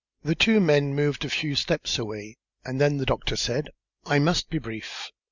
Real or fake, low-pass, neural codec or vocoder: real; 7.2 kHz; none